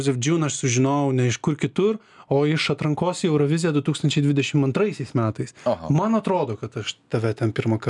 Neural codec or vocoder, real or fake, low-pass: none; real; 10.8 kHz